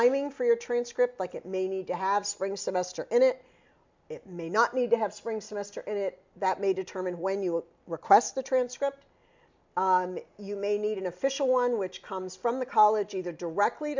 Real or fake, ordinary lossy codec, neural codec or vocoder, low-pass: real; MP3, 64 kbps; none; 7.2 kHz